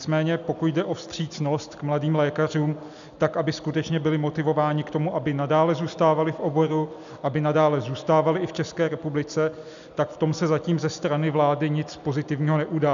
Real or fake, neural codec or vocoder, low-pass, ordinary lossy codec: real; none; 7.2 kHz; MP3, 96 kbps